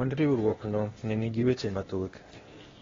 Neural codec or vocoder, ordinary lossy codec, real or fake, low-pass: codec, 16 kHz, 0.8 kbps, ZipCodec; AAC, 24 kbps; fake; 7.2 kHz